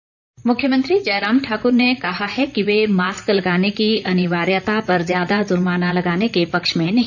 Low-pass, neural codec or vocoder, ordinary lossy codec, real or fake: 7.2 kHz; vocoder, 44.1 kHz, 128 mel bands, Pupu-Vocoder; none; fake